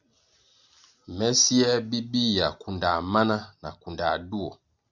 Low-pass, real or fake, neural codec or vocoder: 7.2 kHz; real; none